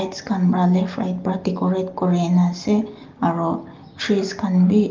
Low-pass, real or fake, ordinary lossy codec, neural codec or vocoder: 7.2 kHz; real; Opus, 32 kbps; none